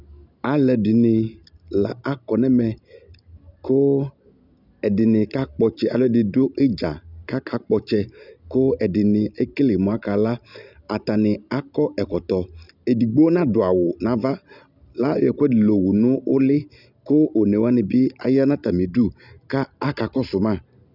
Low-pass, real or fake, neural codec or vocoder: 5.4 kHz; real; none